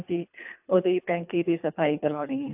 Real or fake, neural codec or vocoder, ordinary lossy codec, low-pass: fake; codec, 24 kHz, 3 kbps, HILCodec; AAC, 32 kbps; 3.6 kHz